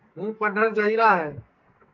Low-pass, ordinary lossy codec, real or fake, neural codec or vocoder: 7.2 kHz; AAC, 48 kbps; fake; codec, 44.1 kHz, 2.6 kbps, SNAC